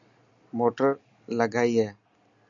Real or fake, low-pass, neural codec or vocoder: real; 7.2 kHz; none